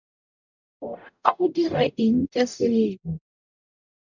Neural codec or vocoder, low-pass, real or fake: codec, 44.1 kHz, 0.9 kbps, DAC; 7.2 kHz; fake